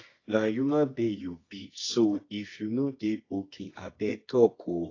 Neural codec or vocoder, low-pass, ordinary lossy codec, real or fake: codec, 24 kHz, 0.9 kbps, WavTokenizer, medium music audio release; 7.2 kHz; AAC, 32 kbps; fake